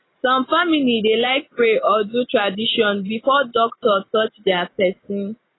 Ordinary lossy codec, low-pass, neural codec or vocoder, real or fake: AAC, 16 kbps; 7.2 kHz; none; real